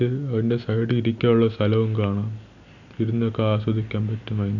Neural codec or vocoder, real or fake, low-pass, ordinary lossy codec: none; real; 7.2 kHz; none